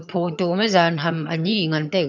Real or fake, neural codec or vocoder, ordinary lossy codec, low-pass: fake; vocoder, 22.05 kHz, 80 mel bands, HiFi-GAN; none; 7.2 kHz